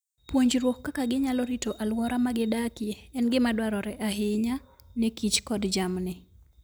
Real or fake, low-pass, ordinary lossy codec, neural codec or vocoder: real; none; none; none